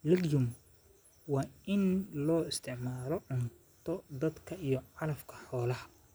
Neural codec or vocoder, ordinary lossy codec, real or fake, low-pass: none; none; real; none